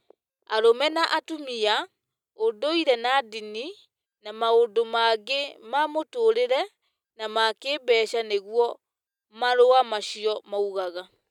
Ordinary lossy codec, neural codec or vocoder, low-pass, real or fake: none; none; 19.8 kHz; real